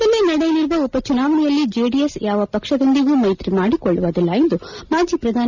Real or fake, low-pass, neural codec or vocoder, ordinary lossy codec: real; 7.2 kHz; none; none